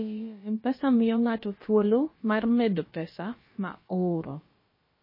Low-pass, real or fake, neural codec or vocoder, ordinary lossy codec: 5.4 kHz; fake; codec, 16 kHz, about 1 kbps, DyCAST, with the encoder's durations; MP3, 24 kbps